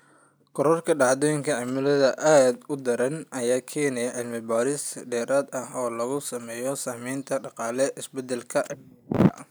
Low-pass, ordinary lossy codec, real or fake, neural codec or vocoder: none; none; real; none